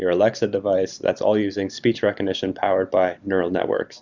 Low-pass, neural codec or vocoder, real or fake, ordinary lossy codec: 7.2 kHz; vocoder, 44.1 kHz, 128 mel bands every 512 samples, BigVGAN v2; fake; Opus, 64 kbps